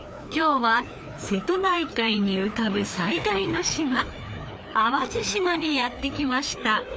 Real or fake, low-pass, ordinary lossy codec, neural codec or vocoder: fake; none; none; codec, 16 kHz, 2 kbps, FreqCodec, larger model